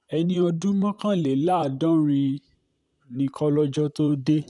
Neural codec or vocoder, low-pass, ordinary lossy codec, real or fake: vocoder, 44.1 kHz, 128 mel bands, Pupu-Vocoder; 10.8 kHz; none; fake